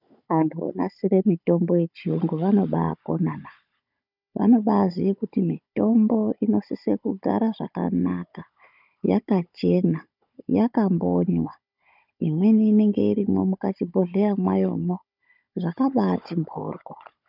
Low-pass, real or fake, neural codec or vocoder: 5.4 kHz; fake; codec, 16 kHz, 16 kbps, FunCodec, trained on Chinese and English, 50 frames a second